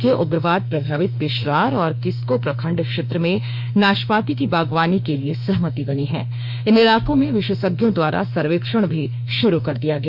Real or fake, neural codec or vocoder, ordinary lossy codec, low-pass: fake; autoencoder, 48 kHz, 32 numbers a frame, DAC-VAE, trained on Japanese speech; MP3, 48 kbps; 5.4 kHz